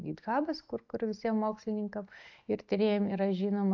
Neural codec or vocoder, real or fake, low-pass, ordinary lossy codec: none; real; 7.2 kHz; AAC, 48 kbps